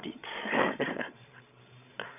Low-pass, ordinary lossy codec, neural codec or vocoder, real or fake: 3.6 kHz; none; codec, 16 kHz, 16 kbps, FreqCodec, larger model; fake